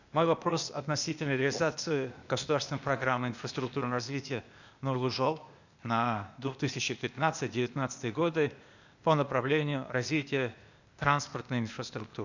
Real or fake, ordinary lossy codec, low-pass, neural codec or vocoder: fake; none; 7.2 kHz; codec, 16 kHz, 0.8 kbps, ZipCodec